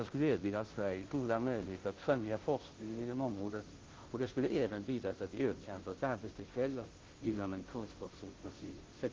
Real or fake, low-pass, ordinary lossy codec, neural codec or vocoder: fake; 7.2 kHz; Opus, 16 kbps; codec, 16 kHz, 0.5 kbps, FunCodec, trained on Chinese and English, 25 frames a second